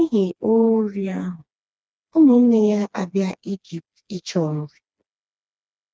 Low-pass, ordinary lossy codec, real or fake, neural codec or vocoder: none; none; fake; codec, 16 kHz, 2 kbps, FreqCodec, smaller model